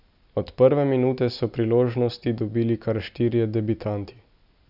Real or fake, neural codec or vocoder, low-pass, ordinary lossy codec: real; none; 5.4 kHz; none